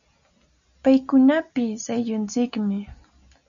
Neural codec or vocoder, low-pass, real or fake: none; 7.2 kHz; real